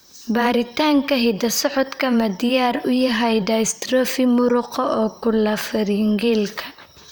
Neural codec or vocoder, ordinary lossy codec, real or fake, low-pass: vocoder, 44.1 kHz, 128 mel bands, Pupu-Vocoder; none; fake; none